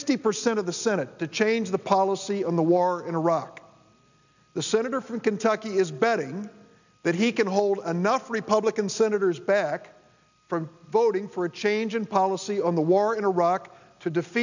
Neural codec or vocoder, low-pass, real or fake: none; 7.2 kHz; real